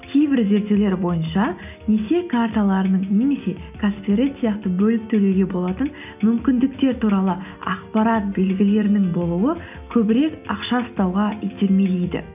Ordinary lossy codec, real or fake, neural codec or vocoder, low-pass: none; real; none; 3.6 kHz